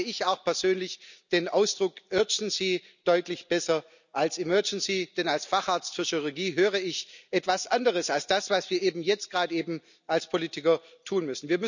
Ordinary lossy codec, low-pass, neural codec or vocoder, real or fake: none; 7.2 kHz; none; real